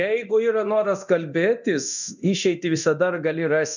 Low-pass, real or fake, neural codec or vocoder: 7.2 kHz; fake; codec, 24 kHz, 0.9 kbps, DualCodec